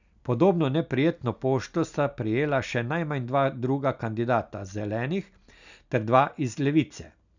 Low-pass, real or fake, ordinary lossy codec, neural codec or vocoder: 7.2 kHz; real; none; none